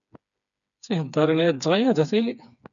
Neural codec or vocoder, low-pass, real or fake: codec, 16 kHz, 4 kbps, FreqCodec, smaller model; 7.2 kHz; fake